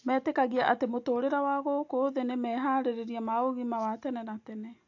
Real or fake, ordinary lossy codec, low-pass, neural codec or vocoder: real; AAC, 48 kbps; 7.2 kHz; none